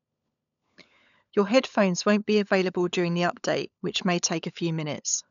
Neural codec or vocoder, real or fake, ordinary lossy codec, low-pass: codec, 16 kHz, 16 kbps, FunCodec, trained on LibriTTS, 50 frames a second; fake; none; 7.2 kHz